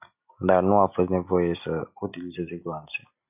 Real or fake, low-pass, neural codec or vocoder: real; 3.6 kHz; none